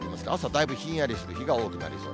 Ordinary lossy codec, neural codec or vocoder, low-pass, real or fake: none; none; none; real